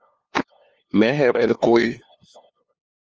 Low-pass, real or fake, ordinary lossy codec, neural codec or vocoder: 7.2 kHz; fake; Opus, 24 kbps; codec, 16 kHz, 2 kbps, FunCodec, trained on LibriTTS, 25 frames a second